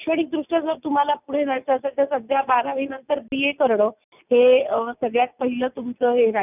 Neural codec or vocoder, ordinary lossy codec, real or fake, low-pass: none; none; real; 3.6 kHz